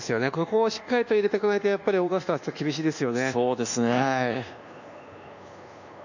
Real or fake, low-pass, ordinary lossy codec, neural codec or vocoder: fake; 7.2 kHz; none; codec, 24 kHz, 1.2 kbps, DualCodec